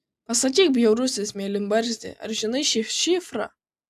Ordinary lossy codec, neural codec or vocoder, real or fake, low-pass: AAC, 96 kbps; none; real; 14.4 kHz